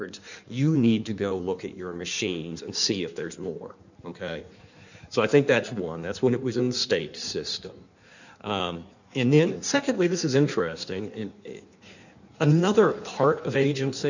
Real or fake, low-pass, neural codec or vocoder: fake; 7.2 kHz; codec, 16 kHz in and 24 kHz out, 1.1 kbps, FireRedTTS-2 codec